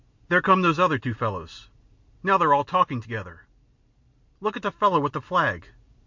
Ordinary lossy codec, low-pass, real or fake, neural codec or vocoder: MP3, 64 kbps; 7.2 kHz; real; none